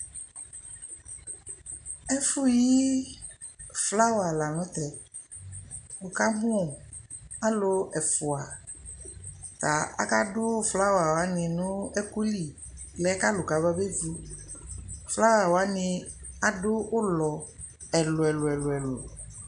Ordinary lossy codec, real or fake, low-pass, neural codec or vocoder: MP3, 96 kbps; real; 9.9 kHz; none